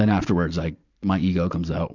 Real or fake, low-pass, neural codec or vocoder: fake; 7.2 kHz; vocoder, 44.1 kHz, 128 mel bands every 512 samples, BigVGAN v2